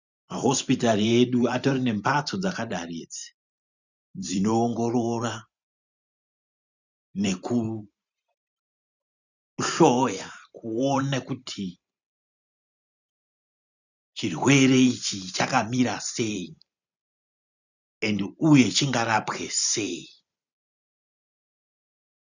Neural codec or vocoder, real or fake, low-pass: vocoder, 44.1 kHz, 128 mel bands every 512 samples, BigVGAN v2; fake; 7.2 kHz